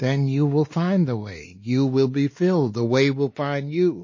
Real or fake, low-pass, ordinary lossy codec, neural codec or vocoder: fake; 7.2 kHz; MP3, 32 kbps; codec, 16 kHz, 2 kbps, X-Codec, WavLM features, trained on Multilingual LibriSpeech